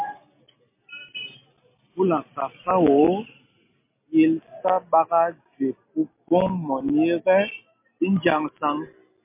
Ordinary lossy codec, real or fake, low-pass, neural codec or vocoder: MP3, 24 kbps; real; 3.6 kHz; none